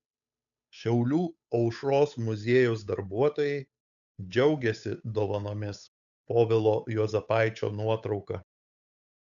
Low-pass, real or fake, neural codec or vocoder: 7.2 kHz; fake; codec, 16 kHz, 8 kbps, FunCodec, trained on Chinese and English, 25 frames a second